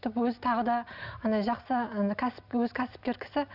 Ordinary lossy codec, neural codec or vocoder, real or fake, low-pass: none; none; real; 5.4 kHz